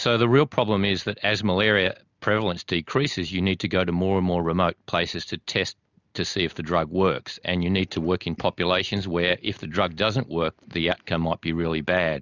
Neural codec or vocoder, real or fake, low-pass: none; real; 7.2 kHz